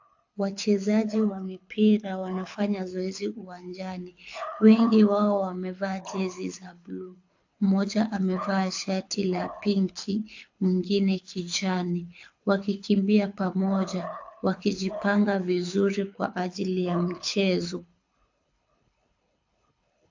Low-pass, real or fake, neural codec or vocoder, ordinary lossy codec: 7.2 kHz; fake; codec, 24 kHz, 6 kbps, HILCodec; AAC, 48 kbps